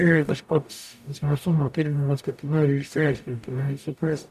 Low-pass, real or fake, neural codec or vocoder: 14.4 kHz; fake; codec, 44.1 kHz, 0.9 kbps, DAC